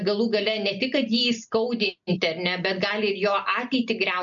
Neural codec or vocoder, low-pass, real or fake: none; 7.2 kHz; real